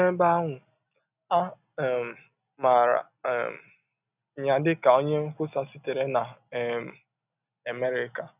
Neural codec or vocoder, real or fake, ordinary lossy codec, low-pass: none; real; none; 3.6 kHz